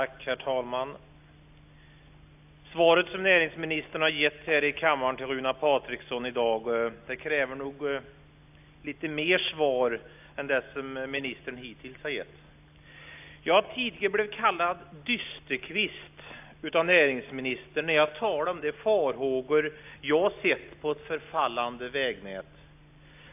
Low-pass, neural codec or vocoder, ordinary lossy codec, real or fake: 3.6 kHz; none; AAC, 32 kbps; real